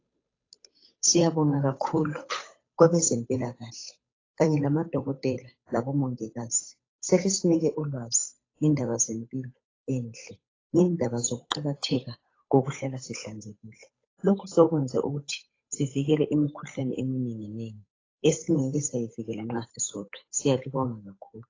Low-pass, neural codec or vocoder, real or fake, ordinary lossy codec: 7.2 kHz; codec, 16 kHz, 8 kbps, FunCodec, trained on Chinese and English, 25 frames a second; fake; AAC, 32 kbps